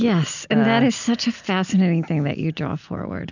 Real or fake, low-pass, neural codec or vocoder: real; 7.2 kHz; none